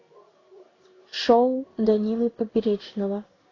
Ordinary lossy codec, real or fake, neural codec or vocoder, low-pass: AAC, 32 kbps; fake; codec, 16 kHz in and 24 kHz out, 1 kbps, XY-Tokenizer; 7.2 kHz